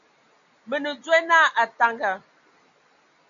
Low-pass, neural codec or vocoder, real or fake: 7.2 kHz; none; real